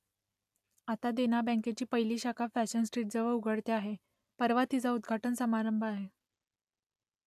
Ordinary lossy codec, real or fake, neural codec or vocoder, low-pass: AAC, 96 kbps; real; none; 14.4 kHz